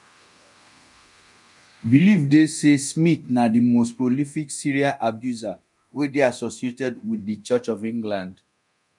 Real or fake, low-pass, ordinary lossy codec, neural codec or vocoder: fake; 10.8 kHz; none; codec, 24 kHz, 0.9 kbps, DualCodec